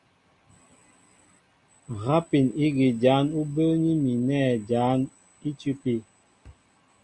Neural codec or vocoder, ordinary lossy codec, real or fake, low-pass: none; Opus, 64 kbps; real; 10.8 kHz